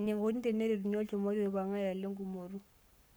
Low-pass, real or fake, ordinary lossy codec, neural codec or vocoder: none; fake; none; codec, 44.1 kHz, 7.8 kbps, Pupu-Codec